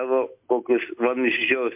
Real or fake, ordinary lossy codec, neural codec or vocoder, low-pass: real; MP3, 32 kbps; none; 3.6 kHz